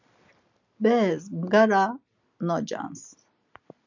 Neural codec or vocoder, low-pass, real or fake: none; 7.2 kHz; real